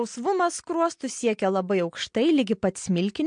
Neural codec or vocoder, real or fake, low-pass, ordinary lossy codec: none; real; 9.9 kHz; MP3, 64 kbps